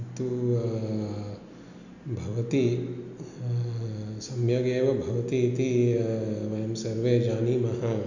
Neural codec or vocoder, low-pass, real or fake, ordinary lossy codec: none; 7.2 kHz; real; none